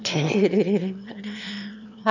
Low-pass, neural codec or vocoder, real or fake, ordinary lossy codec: 7.2 kHz; autoencoder, 22.05 kHz, a latent of 192 numbers a frame, VITS, trained on one speaker; fake; none